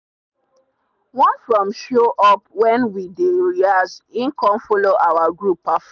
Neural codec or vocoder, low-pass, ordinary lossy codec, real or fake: none; 7.2 kHz; none; real